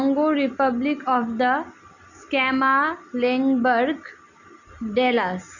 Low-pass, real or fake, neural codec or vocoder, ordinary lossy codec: 7.2 kHz; real; none; none